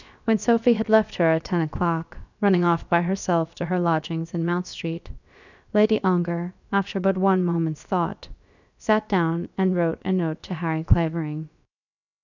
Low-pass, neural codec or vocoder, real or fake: 7.2 kHz; codec, 16 kHz, about 1 kbps, DyCAST, with the encoder's durations; fake